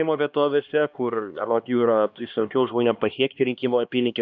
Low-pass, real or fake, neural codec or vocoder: 7.2 kHz; fake; codec, 16 kHz, 2 kbps, X-Codec, HuBERT features, trained on LibriSpeech